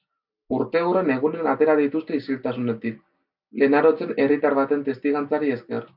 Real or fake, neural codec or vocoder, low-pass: real; none; 5.4 kHz